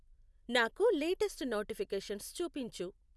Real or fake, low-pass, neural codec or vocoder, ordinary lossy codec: real; none; none; none